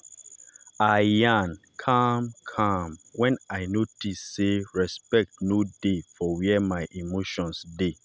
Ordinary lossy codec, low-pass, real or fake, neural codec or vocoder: none; none; real; none